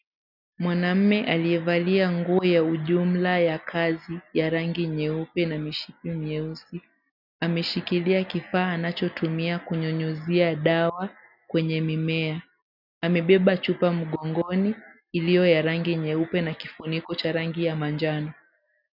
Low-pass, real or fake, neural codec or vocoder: 5.4 kHz; real; none